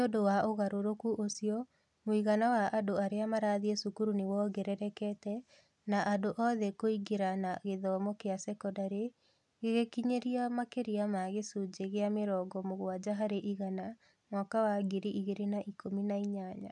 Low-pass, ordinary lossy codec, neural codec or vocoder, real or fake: 10.8 kHz; none; none; real